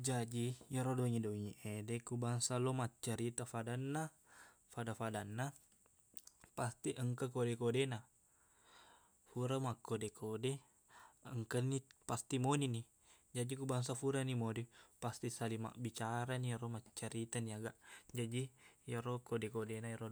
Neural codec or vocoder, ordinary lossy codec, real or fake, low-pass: none; none; real; none